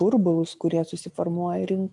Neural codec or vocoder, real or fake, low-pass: none; real; 10.8 kHz